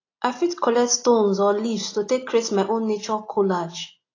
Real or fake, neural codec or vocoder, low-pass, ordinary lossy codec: real; none; 7.2 kHz; AAC, 32 kbps